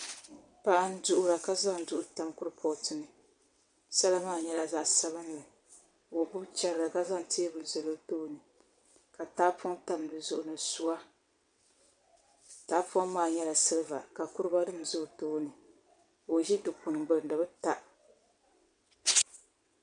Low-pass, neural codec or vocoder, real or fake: 9.9 kHz; vocoder, 22.05 kHz, 80 mel bands, WaveNeXt; fake